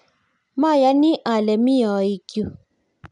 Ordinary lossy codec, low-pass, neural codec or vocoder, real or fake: none; 10.8 kHz; none; real